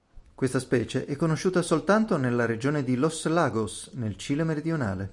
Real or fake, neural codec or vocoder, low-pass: real; none; 10.8 kHz